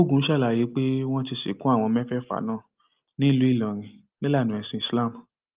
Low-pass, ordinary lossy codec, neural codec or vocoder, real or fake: 3.6 kHz; Opus, 32 kbps; none; real